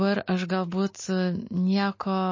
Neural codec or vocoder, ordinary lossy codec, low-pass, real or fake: none; MP3, 32 kbps; 7.2 kHz; real